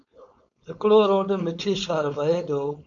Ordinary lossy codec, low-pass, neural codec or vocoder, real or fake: AAC, 64 kbps; 7.2 kHz; codec, 16 kHz, 4.8 kbps, FACodec; fake